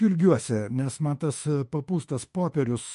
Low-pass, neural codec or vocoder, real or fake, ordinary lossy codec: 14.4 kHz; autoencoder, 48 kHz, 32 numbers a frame, DAC-VAE, trained on Japanese speech; fake; MP3, 48 kbps